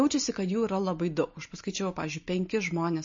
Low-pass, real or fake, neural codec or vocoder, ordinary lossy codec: 7.2 kHz; real; none; MP3, 32 kbps